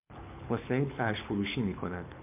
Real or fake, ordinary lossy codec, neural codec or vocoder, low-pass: fake; MP3, 32 kbps; codec, 24 kHz, 6 kbps, HILCodec; 3.6 kHz